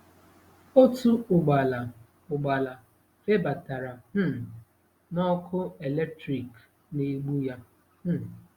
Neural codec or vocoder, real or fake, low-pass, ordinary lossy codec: none; real; 19.8 kHz; Opus, 64 kbps